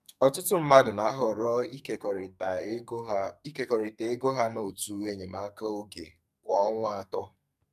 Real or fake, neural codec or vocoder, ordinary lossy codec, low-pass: fake; codec, 44.1 kHz, 2.6 kbps, SNAC; none; 14.4 kHz